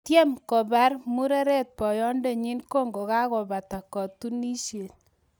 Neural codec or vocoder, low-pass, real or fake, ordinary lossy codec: none; none; real; none